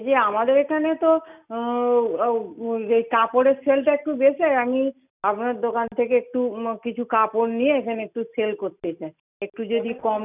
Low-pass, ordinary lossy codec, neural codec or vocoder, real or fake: 3.6 kHz; none; none; real